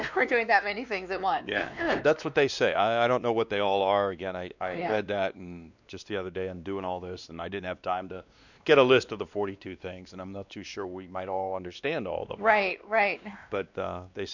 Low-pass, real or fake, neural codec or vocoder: 7.2 kHz; fake; codec, 16 kHz, 2 kbps, X-Codec, WavLM features, trained on Multilingual LibriSpeech